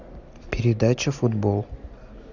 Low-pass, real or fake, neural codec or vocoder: 7.2 kHz; real; none